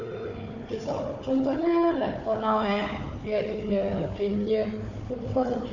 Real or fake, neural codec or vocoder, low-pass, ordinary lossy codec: fake; codec, 16 kHz, 4 kbps, FunCodec, trained on Chinese and English, 50 frames a second; 7.2 kHz; none